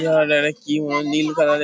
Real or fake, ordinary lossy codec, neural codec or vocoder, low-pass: real; none; none; none